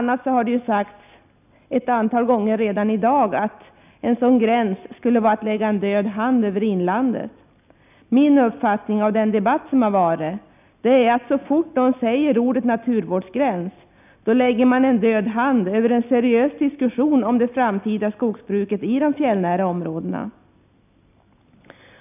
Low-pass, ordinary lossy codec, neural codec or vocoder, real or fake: 3.6 kHz; none; none; real